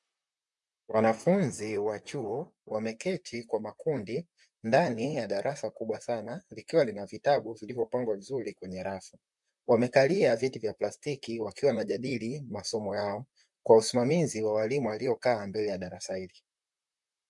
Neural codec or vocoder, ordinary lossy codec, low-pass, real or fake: vocoder, 44.1 kHz, 128 mel bands, Pupu-Vocoder; MP3, 64 kbps; 10.8 kHz; fake